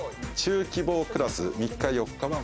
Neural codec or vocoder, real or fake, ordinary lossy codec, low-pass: none; real; none; none